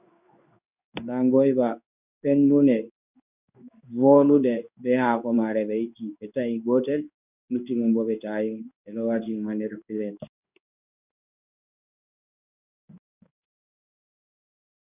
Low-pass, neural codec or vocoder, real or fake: 3.6 kHz; codec, 16 kHz in and 24 kHz out, 1 kbps, XY-Tokenizer; fake